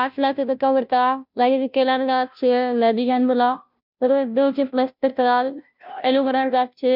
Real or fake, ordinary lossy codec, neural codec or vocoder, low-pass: fake; none; codec, 16 kHz, 0.5 kbps, FunCodec, trained on Chinese and English, 25 frames a second; 5.4 kHz